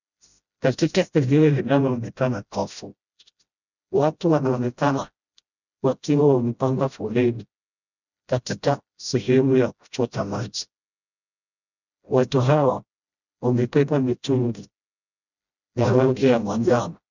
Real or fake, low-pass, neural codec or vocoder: fake; 7.2 kHz; codec, 16 kHz, 0.5 kbps, FreqCodec, smaller model